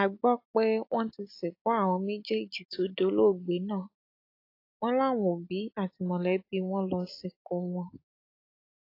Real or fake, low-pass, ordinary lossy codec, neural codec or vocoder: real; 5.4 kHz; AAC, 32 kbps; none